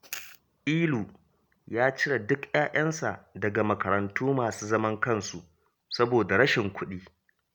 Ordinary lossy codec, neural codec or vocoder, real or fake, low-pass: none; none; real; none